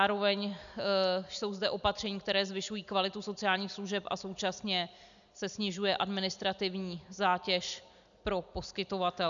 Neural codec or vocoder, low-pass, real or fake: none; 7.2 kHz; real